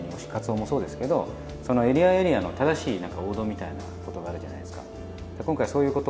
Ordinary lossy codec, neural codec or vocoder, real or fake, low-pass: none; none; real; none